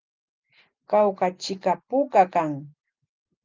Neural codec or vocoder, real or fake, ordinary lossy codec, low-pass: none; real; Opus, 24 kbps; 7.2 kHz